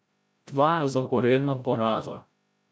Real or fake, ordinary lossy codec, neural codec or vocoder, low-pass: fake; none; codec, 16 kHz, 0.5 kbps, FreqCodec, larger model; none